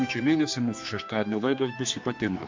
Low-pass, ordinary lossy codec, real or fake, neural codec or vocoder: 7.2 kHz; MP3, 48 kbps; fake; codec, 16 kHz, 2 kbps, X-Codec, HuBERT features, trained on general audio